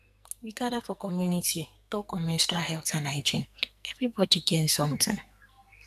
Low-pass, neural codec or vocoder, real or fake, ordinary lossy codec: 14.4 kHz; codec, 44.1 kHz, 2.6 kbps, SNAC; fake; none